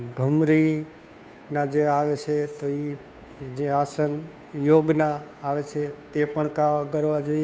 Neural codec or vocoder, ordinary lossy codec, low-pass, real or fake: codec, 16 kHz, 2 kbps, FunCodec, trained on Chinese and English, 25 frames a second; none; none; fake